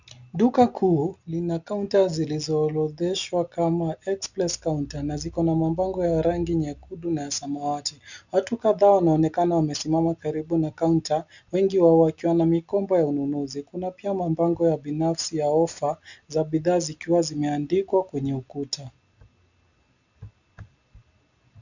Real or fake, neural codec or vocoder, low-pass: real; none; 7.2 kHz